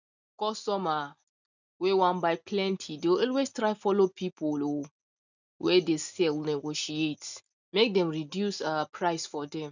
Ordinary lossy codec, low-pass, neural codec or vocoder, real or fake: none; 7.2 kHz; none; real